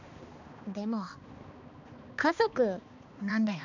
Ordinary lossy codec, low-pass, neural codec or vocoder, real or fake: none; 7.2 kHz; codec, 16 kHz, 2 kbps, X-Codec, HuBERT features, trained on balanced general audio; fake